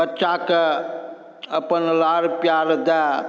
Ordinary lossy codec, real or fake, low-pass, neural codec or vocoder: none; real; none; none